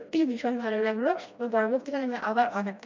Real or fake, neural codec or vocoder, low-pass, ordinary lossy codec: fake; codec, 16 kHz, 1 kbps, FreqCodec, smaller model; 7.2 kHz; MP3, 64 kbps